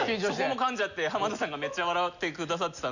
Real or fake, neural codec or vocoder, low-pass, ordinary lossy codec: real; none; 7.2 kHz; none